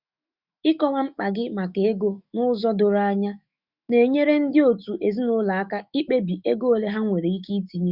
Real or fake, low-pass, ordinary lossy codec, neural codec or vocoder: fake; 5.4 kHz; Opus, 64 kbps; autoencoder, 48 kHz, 128 numbers a frame, DAC-VAE, trained on Japanese speech